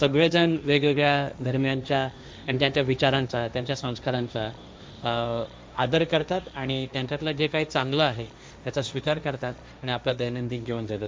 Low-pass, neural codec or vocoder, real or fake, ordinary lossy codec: none; codec, 16 kHz, 1.1 kbps, Voila-Tokenizer; fake; none